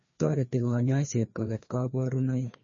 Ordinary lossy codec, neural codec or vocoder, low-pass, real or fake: MP3, 32 kbps; codec, 16 kHz, 2 kbps, FreqCodec, larger model; 7.2 kHz; fake